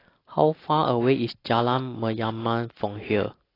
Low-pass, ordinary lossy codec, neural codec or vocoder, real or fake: 5.4 kHz; AAC, 24 kbps; none; real